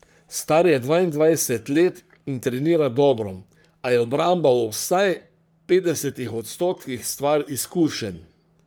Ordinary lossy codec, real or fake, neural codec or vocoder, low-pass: none; fake; codec, 44.1 kHz, 3.4 kbps, Pupu-Codec; none